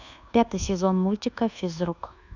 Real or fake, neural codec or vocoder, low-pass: fake; codec, 24 kHz, 1.2 kbps, DualCodec; 7.2 kHz